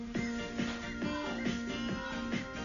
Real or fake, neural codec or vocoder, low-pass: real; none; 7.2 kHz